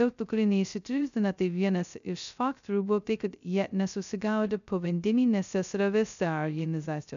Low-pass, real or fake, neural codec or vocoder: 7.2 kHz; fake; codec, 16 kHz, 0.2 kbps, FocalCodec